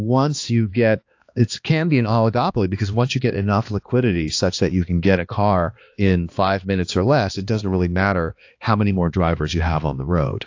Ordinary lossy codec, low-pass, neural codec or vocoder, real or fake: AAC, 48 kbps; 7.2 kHz; codec, 16 kHz, 2 kbps, X-Codec, HuBERT features, trained on balanced general audio; fake